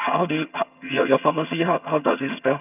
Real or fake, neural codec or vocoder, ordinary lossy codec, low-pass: fake; vocoder, 22.05 kHz, 80 mel bands, HiFi-GAN; none; 3.6 kHz